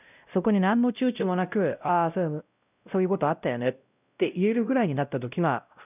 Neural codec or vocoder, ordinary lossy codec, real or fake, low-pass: codec, 16 kHz, 0.5 kbps, X-Codec, WavLM features, trained on Multilingual LibriSpeech; none; fake; 3.6 kHz